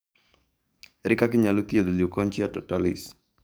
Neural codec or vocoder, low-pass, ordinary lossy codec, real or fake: codec, 44.1 kHz, 7.8 kbps, DAC; none; none; fake